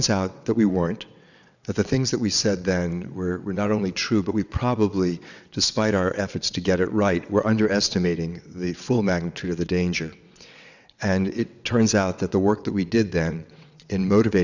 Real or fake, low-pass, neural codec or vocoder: fake; 7.2 kHz; vocoder, 22.05 kHz, 80 mel bands, WaveNeXt